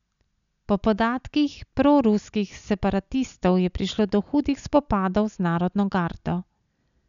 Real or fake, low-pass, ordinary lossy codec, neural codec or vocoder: real; 7.2 kHz; none; none